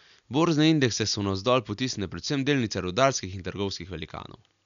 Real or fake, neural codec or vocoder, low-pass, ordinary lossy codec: real; none; 7.2 kHz; none